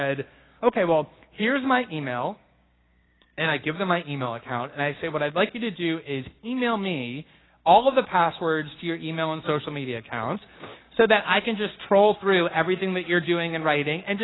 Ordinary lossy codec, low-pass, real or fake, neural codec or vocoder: AAC, 16 kbps; 7.2 kHz; fake; codec, 16 kHz, 6 kbps, DAC